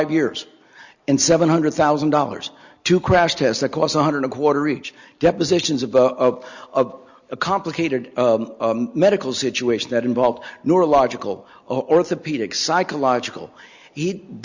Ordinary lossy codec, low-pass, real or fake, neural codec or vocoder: Opus, 64 kbps; 7.2 kHz; real; none